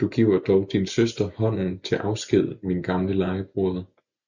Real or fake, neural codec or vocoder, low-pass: real; none; 7.2 kHz